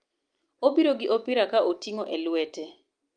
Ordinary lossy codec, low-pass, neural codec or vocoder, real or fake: Opus, 32 kbps; 9.9 kHz; none; real